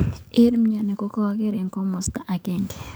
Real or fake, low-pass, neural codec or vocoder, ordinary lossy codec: fake; none; codec, 44.1 kHz, 7.8 kbps, DAC; none